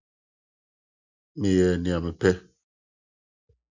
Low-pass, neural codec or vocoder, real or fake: 7.2 kHz; none; real